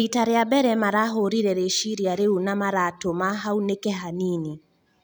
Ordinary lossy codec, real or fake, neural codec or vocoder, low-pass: none; real; none; none